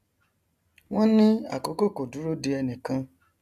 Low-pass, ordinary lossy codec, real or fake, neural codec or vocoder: 14.4 kHz; none; real; none